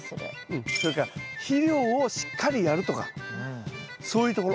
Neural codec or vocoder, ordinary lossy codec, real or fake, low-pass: none; none; real; none